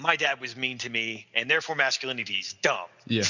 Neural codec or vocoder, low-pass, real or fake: none; 7.2 kHz; real